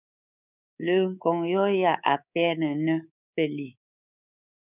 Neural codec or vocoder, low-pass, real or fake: codec, 24 kHz, 3.1 kbps, DualCodec; 3.6 kHz; fake